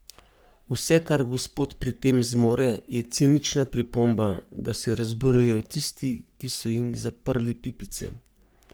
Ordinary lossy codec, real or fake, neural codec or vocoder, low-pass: none; fake; codec, 44.1 kHz, 3.4 kbps, Pupu-Codec; none